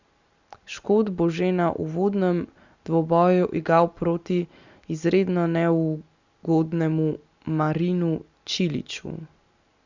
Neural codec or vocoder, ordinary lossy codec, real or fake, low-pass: none; Opus, 64 kbps; real; 7.2 kHz